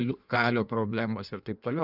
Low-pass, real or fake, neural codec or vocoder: 5.4 kHz; fake; codec, 16 kHz in and 24 kHz out, 1.1 kbps, FireRedTTS-2 codec